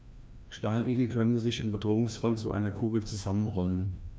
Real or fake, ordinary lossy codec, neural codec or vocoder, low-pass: fake; none; codec, 16 kHz, 1 kbps, FreqCodec, larger model; none